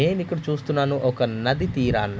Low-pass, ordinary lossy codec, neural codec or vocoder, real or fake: none; none; none; real